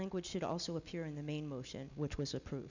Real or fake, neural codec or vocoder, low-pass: real; none; 7.2 kHz